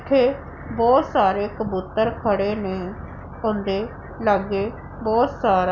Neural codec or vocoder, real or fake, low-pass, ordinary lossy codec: none; real; 7.2 kHz; none